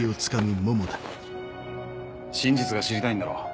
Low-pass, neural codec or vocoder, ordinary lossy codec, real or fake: none; none; none; real